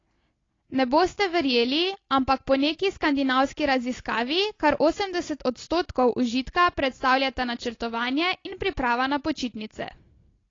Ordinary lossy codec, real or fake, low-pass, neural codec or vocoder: AAC, 32 kbps; real; 7.2 kHz; none